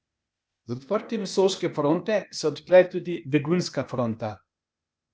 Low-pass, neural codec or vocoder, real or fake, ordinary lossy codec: none; codec, 16 kHz, 0.8 kbps, ZipCodec; fake; none